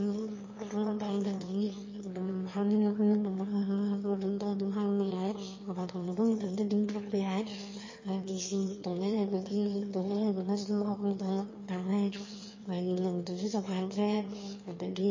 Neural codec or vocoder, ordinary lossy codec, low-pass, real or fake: autoencoder, 22.05 kHz, a latent of 192 numbers a frame, VITS, trained on one speaker; MP3, 32 kbps; 7.2 kHz; fake